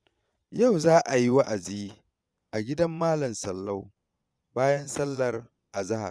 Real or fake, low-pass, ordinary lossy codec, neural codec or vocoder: fake; none; none; vocoder, 22.05 kHz, 80 mel bands, Vocos